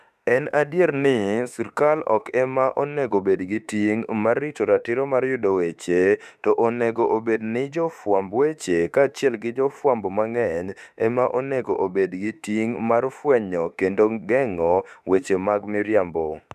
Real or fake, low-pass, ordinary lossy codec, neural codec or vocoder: fake; 14.4 kHz; none; autoencoder, 48 kHz, 32 numbers a frame, DAC-VAE, trained on Japanese speech